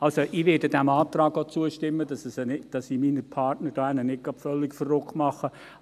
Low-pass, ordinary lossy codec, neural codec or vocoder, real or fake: 14.4 kHz; AAC, 96 kbps; none; real